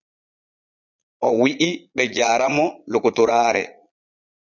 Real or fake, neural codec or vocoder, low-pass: fake; vocoder, 22.05 kHz, 80 mel bands, Vocos; 7.2 kHz